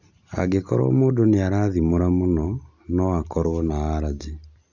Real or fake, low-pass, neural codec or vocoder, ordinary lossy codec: real; 7.2 kHz; none; none